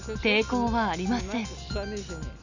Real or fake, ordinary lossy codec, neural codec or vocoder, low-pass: real; none; none; 7.2 kHz